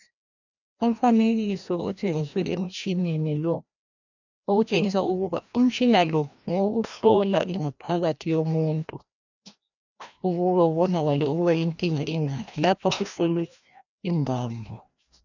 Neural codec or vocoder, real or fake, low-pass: codec, 16 kHz, 1 kbps, FreqCodec, larger model; fake; 7.2 kHz